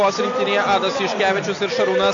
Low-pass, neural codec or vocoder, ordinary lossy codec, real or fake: 7.2 kHz; none; AAC, 48 kbps; real